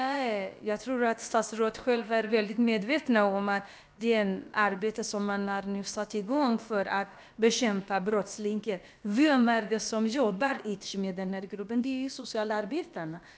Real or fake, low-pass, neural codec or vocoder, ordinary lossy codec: fake; none; codec, 16 kHz, about 1 kbps, DyCAST, with the encoder's durations; none